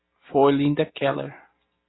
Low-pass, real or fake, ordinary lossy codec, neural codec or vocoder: 7.2 kHz; real; AAC, 16 kbps; none